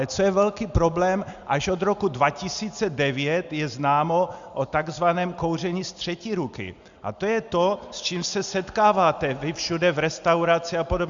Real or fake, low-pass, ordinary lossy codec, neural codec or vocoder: real; 7.2 kHz; Opus, 64 kbps; none